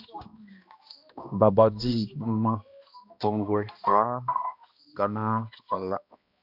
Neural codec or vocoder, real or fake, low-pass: codec, 16 kHz, 1 kbps, X-Codec, HuBERT features, trained on balanced general audio; fake; 5.4 kHz